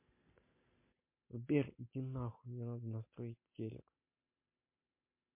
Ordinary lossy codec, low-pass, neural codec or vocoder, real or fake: MP3, 24 kbps; 3.6 kHz; none; real